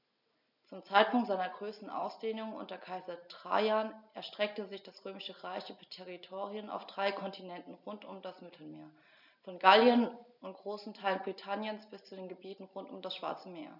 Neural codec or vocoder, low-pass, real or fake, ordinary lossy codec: none; 5.4 kHz; real; none